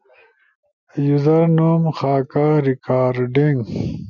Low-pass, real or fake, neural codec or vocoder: 7.2 kHz; real; none